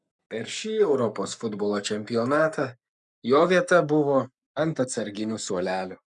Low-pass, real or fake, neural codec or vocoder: 10.8 kHz; fake; codec, 44.1 kHz, 7.8 kbps, Pupu-Codec